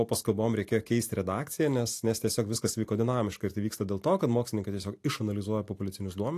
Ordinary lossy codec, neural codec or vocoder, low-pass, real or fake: AAC, 64 kbps; none; 14.4 kHz; real